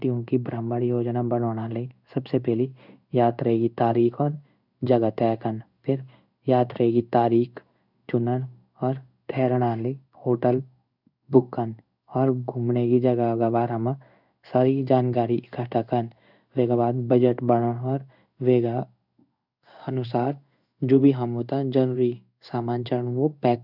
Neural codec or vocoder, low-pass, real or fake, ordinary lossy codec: codec, 16 kHz in and 24 kHz out, 1 kbps, XY-Tokenizer; 5.4 kHz; fake; none